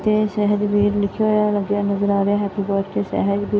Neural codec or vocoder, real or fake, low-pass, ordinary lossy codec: none; real; none; none